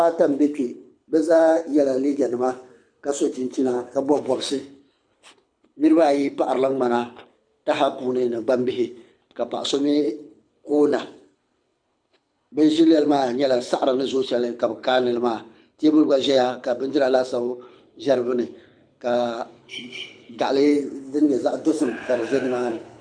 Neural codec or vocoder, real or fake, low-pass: codec, 24 kHz, 6 kbps, HILCodec; fake; 9.9 kHz